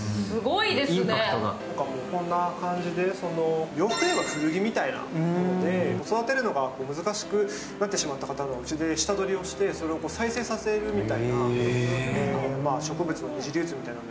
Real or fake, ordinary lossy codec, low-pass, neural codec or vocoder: real; none; none; none